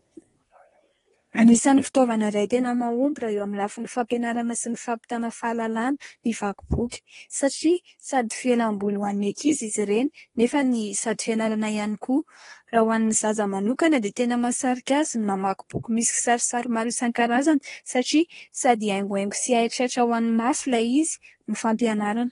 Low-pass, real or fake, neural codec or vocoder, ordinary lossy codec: 10.8 kHz; fake; codec, 24 kHz, 1 kbps, SNAC; AAC, 32 kbps